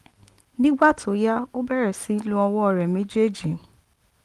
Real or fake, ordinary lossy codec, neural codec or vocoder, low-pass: real; Opus, 24 kbps; none; 14.4 kHz